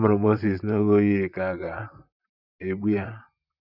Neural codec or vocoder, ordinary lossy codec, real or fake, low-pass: vocoder, 44.1 kHz, 128 mel bands, Pupu-Vocoder; none; fake; 5.4 kHz